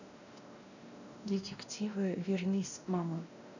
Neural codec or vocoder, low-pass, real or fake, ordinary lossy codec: codec, 16 kHz, 1 kbps, X-Codec, WavLM features, trained on Multilingual LibriSpeech; 7.2 kHz; fake; none